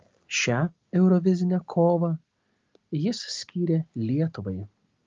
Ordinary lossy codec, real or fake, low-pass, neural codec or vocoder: Opus, 32 kbps; real; 7.2 kHz; none